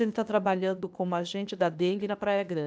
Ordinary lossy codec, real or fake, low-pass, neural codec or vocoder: none; fake; none; codec, 16 kHz, 0.8 kbps, ZipCodec